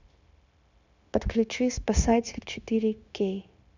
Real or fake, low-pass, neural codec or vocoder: fake; 7.2 kHz; codec, 16 kHz, 0.9 kbps, LongCat-Audio-Codec